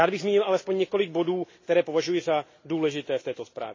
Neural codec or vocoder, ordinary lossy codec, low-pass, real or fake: none; MP3, 32 kbps; 7.2 kHz; real